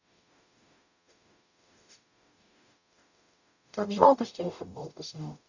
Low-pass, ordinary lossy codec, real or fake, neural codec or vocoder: 7.2 kHz; none; fake; codec, 44.1 kHz, 0.9 kbps, DAC